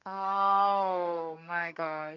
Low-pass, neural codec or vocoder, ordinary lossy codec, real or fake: 7.2 kHz; codec, 32 kHz, 1.9 kbps, SNAC; none; fake